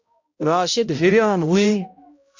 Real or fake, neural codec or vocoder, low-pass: fake; codec, 16 kHz, 0.5 kbps, X-Codec, HuBERT features, trained on balanced general audio; 7.2 kHz